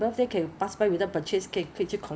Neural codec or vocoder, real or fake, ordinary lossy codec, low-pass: none; real; none; none